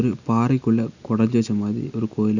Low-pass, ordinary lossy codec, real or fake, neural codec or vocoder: 7.2 kHz; none; real; none